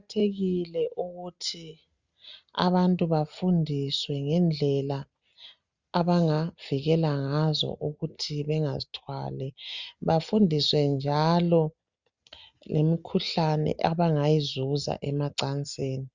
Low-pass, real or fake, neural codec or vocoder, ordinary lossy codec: 7.2 kHz; real; none; Opus, 64 kbps